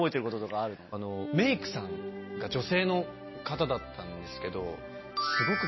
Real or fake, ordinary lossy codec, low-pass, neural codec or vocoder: real; MP3, 24 kbps; 7.2 kHz; none